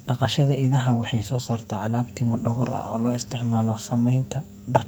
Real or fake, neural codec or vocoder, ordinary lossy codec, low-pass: fake; codec, 44.1 kHz, 2.6 kbps, SNAC; none; none